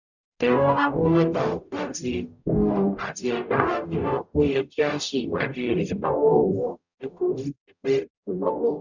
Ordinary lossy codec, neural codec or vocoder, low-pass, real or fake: none; codec, 44.1 kHz, 0.9 kbps, DAC; 7.2 kHz; fake